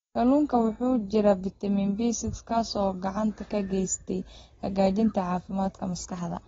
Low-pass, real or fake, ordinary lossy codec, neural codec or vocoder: 7.2 kHz; real; AAC, 24 kbps; none